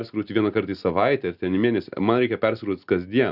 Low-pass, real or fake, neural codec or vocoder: 5.4 kHz; real; none